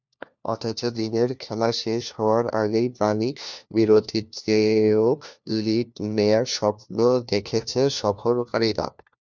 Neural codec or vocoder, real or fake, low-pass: codec, 16 kHz, 1 kbps, FunCodec, trained on LibriTTS, 50 frames a second; fake; 7.2 kHz